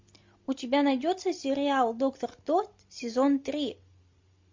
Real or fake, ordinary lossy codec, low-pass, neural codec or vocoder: real; MP3, 48 kbps; 7.2 kHz; none